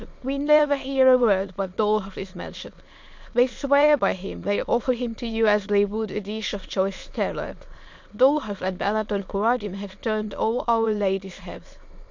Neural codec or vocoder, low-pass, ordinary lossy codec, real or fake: autoencoder, 22.05 kHz, a latent of 192 numbers a frame, VITS, trained on many speakers; 7.2 kHz; MP3, 48 kbps; fake